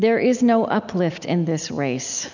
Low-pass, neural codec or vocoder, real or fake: 7.2 kHz; none; real